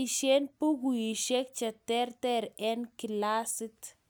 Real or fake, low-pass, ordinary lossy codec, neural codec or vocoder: real; none; none; none